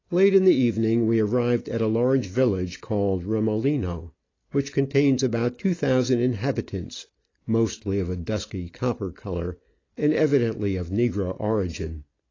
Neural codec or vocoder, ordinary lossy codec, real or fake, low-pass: none; AAC, 32 kbps; real; 7.2 kHz